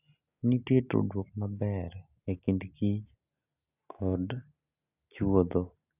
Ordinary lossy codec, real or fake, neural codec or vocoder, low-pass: none; real; none; 3.6 kHz